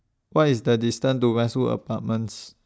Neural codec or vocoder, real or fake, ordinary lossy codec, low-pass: none; real; none; none